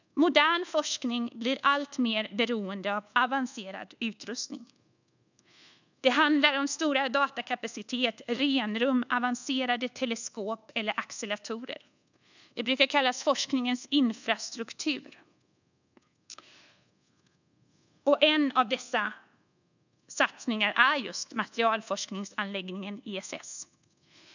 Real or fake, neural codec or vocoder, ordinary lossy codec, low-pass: fake; codec, 24 kHz, 1.2 kbps, DualCodec; none; 7.2 kHz